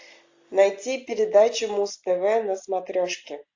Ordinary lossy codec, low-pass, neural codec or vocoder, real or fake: AAC, 48 kbps; 7.2 kHz; none; real